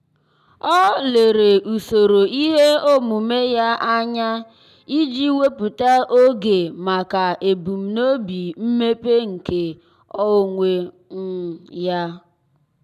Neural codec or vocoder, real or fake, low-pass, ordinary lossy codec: none; real; 14.4 kHz; none